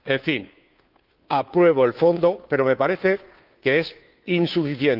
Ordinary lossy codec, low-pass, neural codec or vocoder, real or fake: Opus, 24 kbps; 5.4 kHz; codec, 16 kHz, 2 kbps, FunCodec, trained on Chinese and English, 25 frames a second; fake